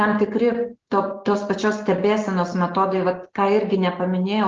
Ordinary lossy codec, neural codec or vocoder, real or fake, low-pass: Opus, 16 kbps; none; real; 7.2 kHz